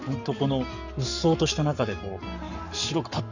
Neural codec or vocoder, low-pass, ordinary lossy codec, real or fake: codec, 44.1 kHz, 7.8 kbps, Pupu-Codec; 7.2 kHz; none; fake